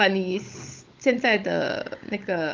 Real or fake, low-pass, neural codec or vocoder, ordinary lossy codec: fake; 7.2 kHz; codec, 16 kHz, 16 kbps, FunCodec, trained on LibriTTS, 50 frames a second; Opus, 24 kbps